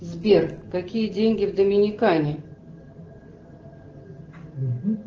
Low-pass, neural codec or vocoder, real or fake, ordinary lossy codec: 7.2 kHz; none; real; Opus, 16 kbps